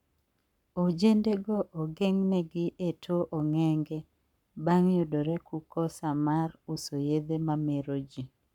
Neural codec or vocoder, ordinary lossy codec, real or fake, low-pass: codec, 44.1 kHz, 7.8 kbps, Pupu-Codec; none; fake; 19.8 kHz